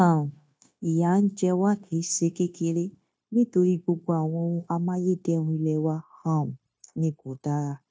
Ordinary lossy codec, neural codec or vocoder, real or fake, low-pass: none; codec, 16 kHz, 0.9 kbps, LongCat-Audio-Codec; fake; none